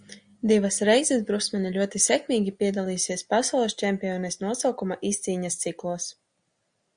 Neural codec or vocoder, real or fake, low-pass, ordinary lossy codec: none; real; 9.9 kHz; Opus, 64 kbps